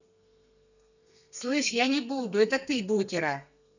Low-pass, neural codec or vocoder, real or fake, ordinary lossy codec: 7.2 kHz; codec, 32 kHz, 1.9 kbps, SNAC; fake; none